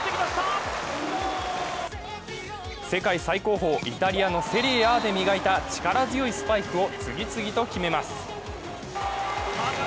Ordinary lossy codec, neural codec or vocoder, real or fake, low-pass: none; none; real; none